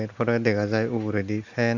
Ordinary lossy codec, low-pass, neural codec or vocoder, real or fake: Opus, 64 kbps; 7.2 kHz; none; real